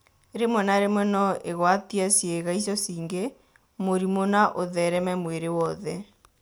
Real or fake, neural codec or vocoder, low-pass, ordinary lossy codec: real; none; none; none